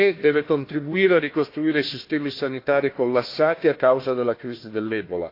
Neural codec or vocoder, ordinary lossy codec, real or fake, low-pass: codec, 16 kHz, 1 kbps, FunCodec, trained on Chinese and English, 50 frames a second; AAC, 32 kbps; fake; 5.4 kHz